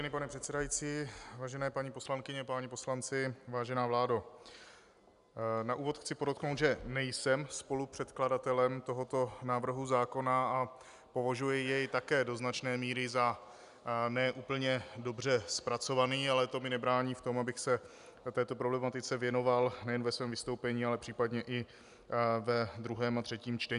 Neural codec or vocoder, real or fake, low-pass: none; real; 10.8 kHz